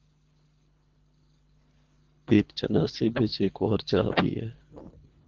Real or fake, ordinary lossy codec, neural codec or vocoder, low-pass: fake; Opus, 24 kbps; codec, 24 kHz, 3 kbps, HILCodec; 7.2 kHz